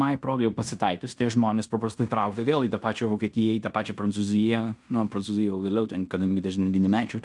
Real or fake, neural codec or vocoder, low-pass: fake; codec, 16 kHz in and 24 kHz out, 0.9 kbps, LongCat-Audio-Codec, fine tuned four codebook decoder; 10.8 kHz